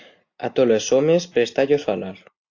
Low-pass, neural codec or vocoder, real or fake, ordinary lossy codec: 7.2 kHz; none; real; AAC, 48 kbps